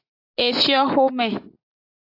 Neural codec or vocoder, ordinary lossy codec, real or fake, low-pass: none; AAC, 32 kbps; real; 5.4 kHz